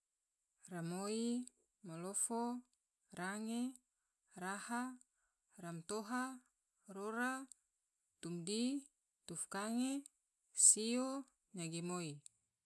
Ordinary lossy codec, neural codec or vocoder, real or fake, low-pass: none; none; real; none